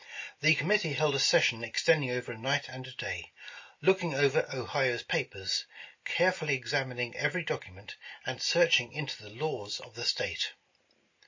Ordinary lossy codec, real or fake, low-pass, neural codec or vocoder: MP3, 32 kbps; real; 7.2 kHz; none